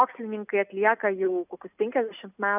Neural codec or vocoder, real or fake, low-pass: none; real; 3.6 kHz